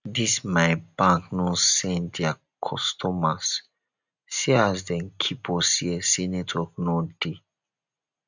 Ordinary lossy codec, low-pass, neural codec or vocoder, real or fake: none; 7.2 kHz; none; real